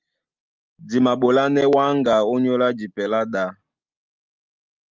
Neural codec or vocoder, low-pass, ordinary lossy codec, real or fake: none; 7.2 kHz; Opus, 24 kbps; real